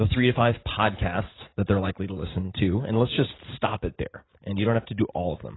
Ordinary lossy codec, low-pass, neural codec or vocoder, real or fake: AAC, 16 kbps; 7.2 kHz; none; real